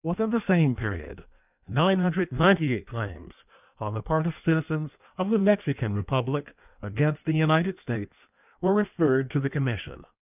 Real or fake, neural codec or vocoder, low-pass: fake; codec, 16 kHz in and 24 kHz out, 1.1 kbps, FireRedTTS-2 codec; 3.6 kHz